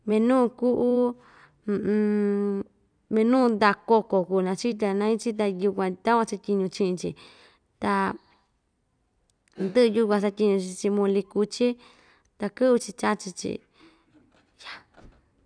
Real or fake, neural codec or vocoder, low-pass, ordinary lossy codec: fake; vocoder, 44.1 kHz, 128 mel bands every 256 samples, BigVGAN v2; 9.9 kHz; none